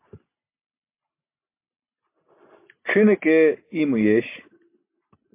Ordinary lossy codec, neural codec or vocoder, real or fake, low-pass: MP3, 24 kbps; none; real; 3.6 kHz